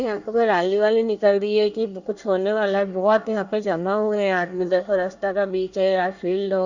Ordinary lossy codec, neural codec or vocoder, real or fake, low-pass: Opus, 64 kbps; codec, 24 kHz, 1 kbps, SNAC; fake; 7.2 kHz